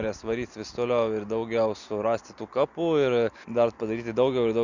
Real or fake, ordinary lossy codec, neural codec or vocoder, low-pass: real; Opus, 64 kbps; none; 7.2 kHz